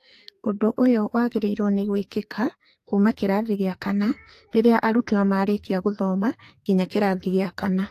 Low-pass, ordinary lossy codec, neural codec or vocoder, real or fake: 14.4 kHz; AAC, 64 kbps; codec, 44.1 kHz, 2.6 kbps, SNAC; fake